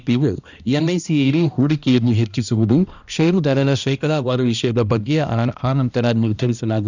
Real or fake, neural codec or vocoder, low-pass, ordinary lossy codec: fake; codec, 16 kHz, 1 kbps, X-Codec, HuBERT features, trained on balanced general audio; 7.2 kHz; none